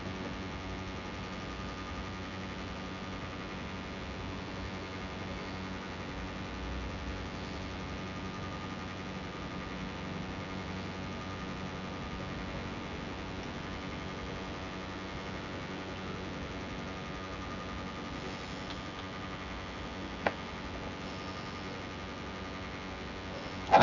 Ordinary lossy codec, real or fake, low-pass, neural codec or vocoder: none; fake; 7.2 kHz; vocoder, 24 kHz, 100 mel bands, Vocos